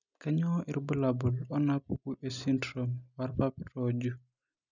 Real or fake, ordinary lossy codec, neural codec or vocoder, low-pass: real; none; none; 7.2 kHz